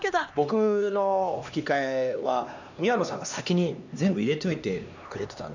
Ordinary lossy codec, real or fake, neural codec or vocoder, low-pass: none; fake; codec, 16 kHz, 2 kbps, X-Codec, HuBERT features, trained on LibriSpeech; 7.2 kHz